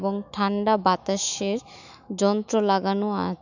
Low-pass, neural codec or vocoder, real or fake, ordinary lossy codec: 7.2 kHz; autoencoder, 48 kHz, 128 numbers a frame, DAC-VAE, trained on Japanese speech; fake; none